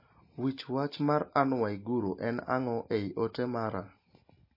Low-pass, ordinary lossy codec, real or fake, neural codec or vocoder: 5.4 kHz; MP3, 24 kbps; real; none